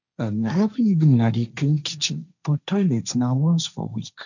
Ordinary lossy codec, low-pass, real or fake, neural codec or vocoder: none; 7.2 kHz; fake; codec, 16 kHz, 1.1 kbps, Voila-Tokenizer